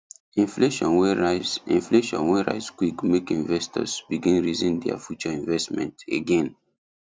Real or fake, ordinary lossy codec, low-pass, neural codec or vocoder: real; none; none; none